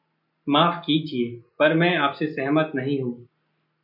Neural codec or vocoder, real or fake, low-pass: none; real; 5.4 kHz